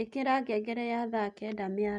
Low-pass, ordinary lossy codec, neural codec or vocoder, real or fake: 10.8 kHz; none; none; real